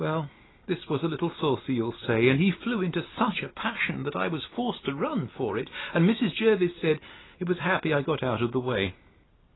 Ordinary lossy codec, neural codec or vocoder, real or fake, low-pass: AAC, 16 kbps; none; real; 7.2 kHz